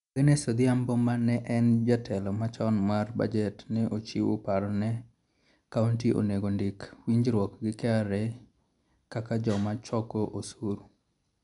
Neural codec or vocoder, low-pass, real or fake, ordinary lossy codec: none; 10.8 kHz; real; none